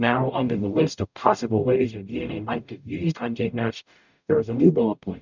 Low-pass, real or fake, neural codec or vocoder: 7.2 kHz; fake; codec, 44.1 kHz, 0.9 kbps, DAC